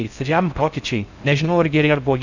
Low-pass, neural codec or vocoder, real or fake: 7.2 kHz; codec, 16 kHz in and 24 kHz out, 0.6 kbps, FocalCodec, streaming, 4096 codes; fake